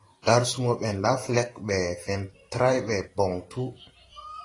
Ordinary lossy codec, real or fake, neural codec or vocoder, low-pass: AAC, 32 kbps; fake; vocoder, 44.1 kHz, 128 mel bands every 512 samples, BigVGAN v2; 10.8 kHz